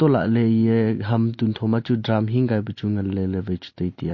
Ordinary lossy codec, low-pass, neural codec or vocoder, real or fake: MP3, 32 kbps; 7.2 kHz; none; real